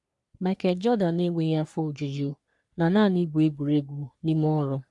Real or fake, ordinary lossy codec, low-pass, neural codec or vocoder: fake; none; 10.8 kHz; codec, 44.1 kHz, 3.4 kbps, Pupu-Codec